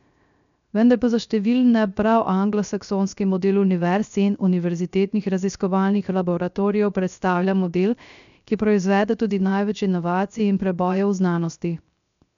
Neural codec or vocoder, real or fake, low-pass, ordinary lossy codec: codec, 16 kHz, 0.3 kbps, FocalCodec; fake; 7.2 kHz; none